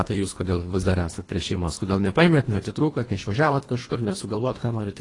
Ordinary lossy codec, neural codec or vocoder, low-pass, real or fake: AAC, 32 kbps; codec, 24 kHz, 1.5 kbps, HILCodec; 10.8 kHz; fake